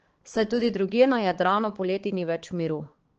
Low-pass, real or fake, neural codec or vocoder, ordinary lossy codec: 7.2 kHz; fake; codec, 16 kHz, 4 kbps, X-Codec, HuBERT features, trained on balanced general audio; Opus, 16 kbps